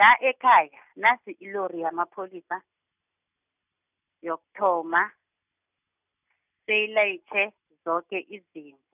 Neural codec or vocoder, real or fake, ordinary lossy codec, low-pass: none; real; none; 3.6 kHz